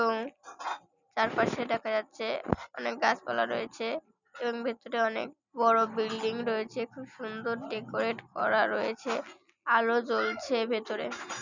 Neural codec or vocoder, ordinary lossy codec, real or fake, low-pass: none; none; real; 7.2 kHz